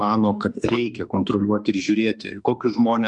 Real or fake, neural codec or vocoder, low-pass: fake; autoencoder, 48 kHz, 32 numbers a frame, DAC-VAE, trained on Japanese speech; 10.8 kHz